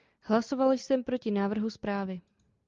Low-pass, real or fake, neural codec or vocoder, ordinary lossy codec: 7.2 kHz; real; none; Opus, 32 kbps